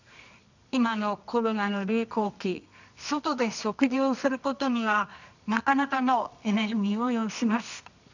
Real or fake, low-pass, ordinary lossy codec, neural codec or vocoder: fake; 7.2 kHz; none; codec, 24 kHz, 0.9 kbps, WavTokenizer, medium music audio release